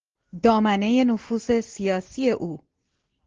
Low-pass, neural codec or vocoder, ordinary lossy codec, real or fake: 7.2 kHz; none; Opus, 16 kbps; real